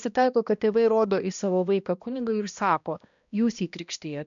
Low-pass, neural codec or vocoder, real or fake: 7.2 kHz; codec, 16 kHz, 1 kbps, X-Codec, HuBERT features, trained on balanced general audio; fake